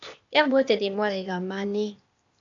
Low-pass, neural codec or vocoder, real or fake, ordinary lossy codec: 7.2 kHz; codec, 16 kHz, 0.8 kbps, ZipCodec; fake; AAC, 64 kbps